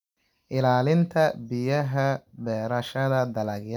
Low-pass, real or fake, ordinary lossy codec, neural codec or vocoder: 19.8 kHz; real; none; none